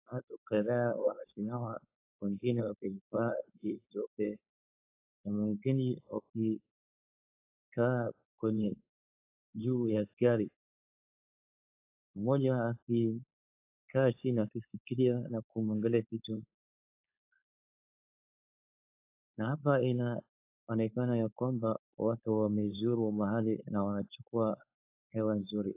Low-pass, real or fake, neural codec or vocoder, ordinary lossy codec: 3.6 kHz; fake; codec, 16 kHz, 4.8 kbps, FACodec; AAC, 32 kbps